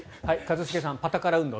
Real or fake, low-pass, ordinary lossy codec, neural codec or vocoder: real; none; none; none